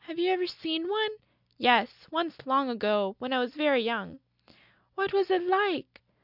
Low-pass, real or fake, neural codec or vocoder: 5.4 kHz; real; none